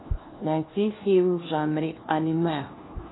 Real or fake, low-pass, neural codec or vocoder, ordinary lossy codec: fake; 7.2 kHz; codec, 16 kHz, 1 kbps, FunCodec, trained on LibriTTS, 50 frames a second; AAC, 16 kbps